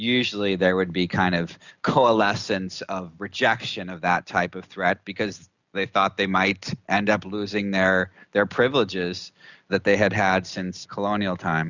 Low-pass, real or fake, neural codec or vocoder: 7.2 kHz; real; none